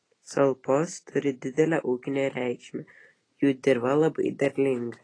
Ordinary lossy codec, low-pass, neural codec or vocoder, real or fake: AAC, 32 kbps; 9.9 kHz; none; real